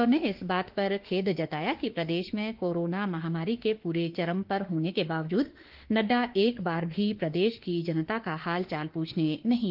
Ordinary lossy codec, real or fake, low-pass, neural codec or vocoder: Opus, 16 kbps; fake; 5.4 kHz; autoencoder, 48 kHz, 32 numbers a frame, DAC-VAE, trained on Japanese speech